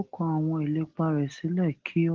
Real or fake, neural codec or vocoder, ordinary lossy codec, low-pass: real; none; Opus, 16 kbps; 7.2 kHz